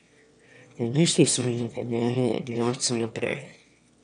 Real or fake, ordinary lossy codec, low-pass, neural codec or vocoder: fake; none; 9.9 kHz; autoencoder, 22.05 kHz, a latent of 192 numbers a frame, VITS, trained on one speaker